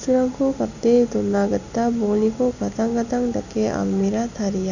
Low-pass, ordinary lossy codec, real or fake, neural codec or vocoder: 7.2 kHz; none; real; none